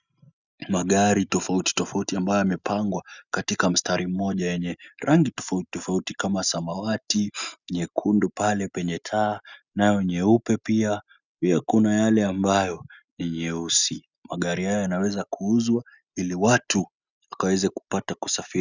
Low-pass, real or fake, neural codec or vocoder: 7.2 kHz; real; none